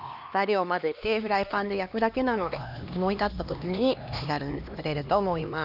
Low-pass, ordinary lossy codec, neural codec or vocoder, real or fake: 5.4 kHz; none; codec, 16 kHz, 2 kbps, X-Codec, HuBERT features, trained on LibriSpeech; fake